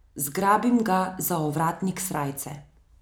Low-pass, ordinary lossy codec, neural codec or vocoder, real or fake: none; none; none; real